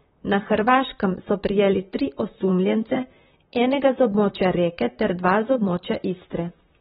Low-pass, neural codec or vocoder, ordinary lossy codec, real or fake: 19.8 kHz; vocoder, 44.1 kHz, 128 mel bands, Pupu-Vocoder; AAC, 16 kbps; fake